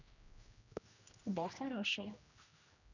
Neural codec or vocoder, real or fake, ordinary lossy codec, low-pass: codec, 16 kHz, 1 kbps, X-Codec, HuBERT features, trained on general audio; fake; none; 7.2 kHz